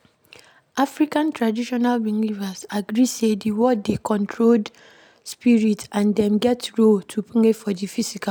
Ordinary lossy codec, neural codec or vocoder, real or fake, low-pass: none; none; real; none